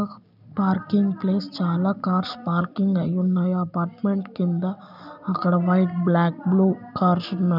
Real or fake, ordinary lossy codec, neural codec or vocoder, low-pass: real; none; none; 5.4 kHz